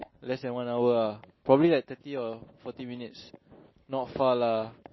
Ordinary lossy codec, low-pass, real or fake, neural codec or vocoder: MP3, 24 kbps; 7.2 kHz; real; none